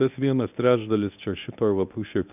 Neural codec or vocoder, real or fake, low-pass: codec, 24 kHz, 0.9 kbps, WavTokenizer, medium speech release version 1; fake; 3.6 kHz